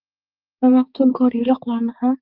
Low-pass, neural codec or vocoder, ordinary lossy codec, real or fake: 5.4 kHz; codec, 16 kHz, 4 kbps, X-Codec, HuBERT features, trained on balanced general audio; Opus, 24 kbps; fake